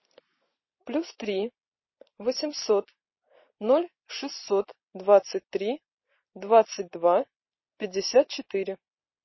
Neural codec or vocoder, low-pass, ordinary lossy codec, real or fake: vocoder, 44.1 kHz, 128 mel bands every 256 samples, BigVGAN v2; 7.2 kHz; MP3, 24 kbps; fake